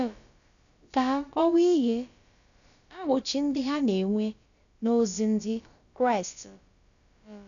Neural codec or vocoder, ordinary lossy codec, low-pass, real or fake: codec, 16 kHz, about 1 kbps, DyCAST, with the encoder's durations; none; 7.2 kHz; fake